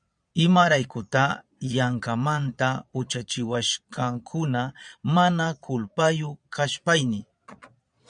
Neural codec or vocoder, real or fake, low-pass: vocoder, 22.05 kHz, 80 mel bands, Vocos; fake; 9.9 kHz